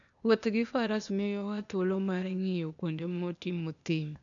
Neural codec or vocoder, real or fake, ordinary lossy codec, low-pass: codec, 16 kHz, 0.8 kbps, ZipCodec; fake; none; 7.2 kHz